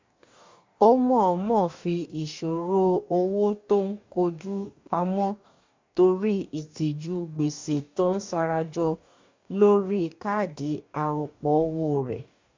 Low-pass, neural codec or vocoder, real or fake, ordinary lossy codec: 7.2 kHz; codec, 44.1 kHz, 2.6 kbps, DAC; fake; MP3, 64 kbps